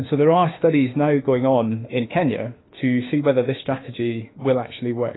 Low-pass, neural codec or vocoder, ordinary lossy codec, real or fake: 7.2 kHz; autoencoder, 48 kHz, 32 numbers a frame, DAC-VAE, trained on Japanese speech; AAC, 16 kbps; fake